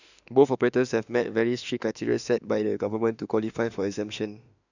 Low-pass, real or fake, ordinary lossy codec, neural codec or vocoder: 7.2 kHz; fake; none; autoencoder, 48 kHz, 32 numbers a frame, DAC-VAE, trained on Japanese speech